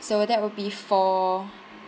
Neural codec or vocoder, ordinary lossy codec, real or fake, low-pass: none; none; real; none